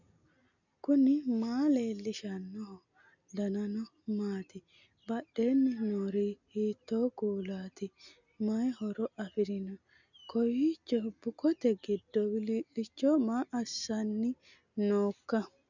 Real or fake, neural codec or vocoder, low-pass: real; none; 7.2 kHz